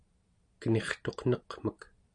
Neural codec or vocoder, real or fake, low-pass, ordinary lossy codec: none; real; 9.9 kHz; MP3, 64 kbps